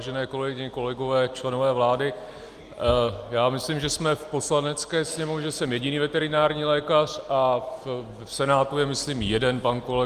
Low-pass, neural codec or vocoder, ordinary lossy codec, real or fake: 14.4 kHz; none; Opus, 32 kbps; real